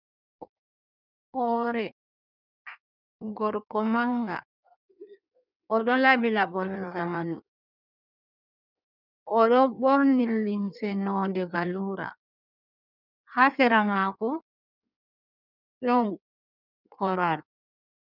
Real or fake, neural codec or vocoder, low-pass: fake; codec, 16 kHz in and 24 kHz out, 1.1 kbps, FireRedTTS-2 codec; 5.4 kHz